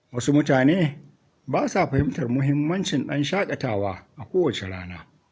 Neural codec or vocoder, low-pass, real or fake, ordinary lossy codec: none; none; real; none